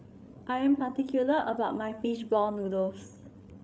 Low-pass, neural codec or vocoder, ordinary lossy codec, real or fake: none; codec, 16 kHz, 8 kbps, FreqCodec, larger model; none; fake